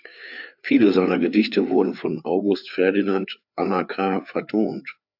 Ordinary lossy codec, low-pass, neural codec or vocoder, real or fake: AAC, 48 kbps; 5.4 kHz; codec, 16 kHz, 4 kbps, FreqCodec, larger model; fake